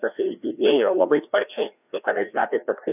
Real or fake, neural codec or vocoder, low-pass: fake; codec, 16 kHz, 1 kbps, FreqCodec, larger model; 3.6 kHz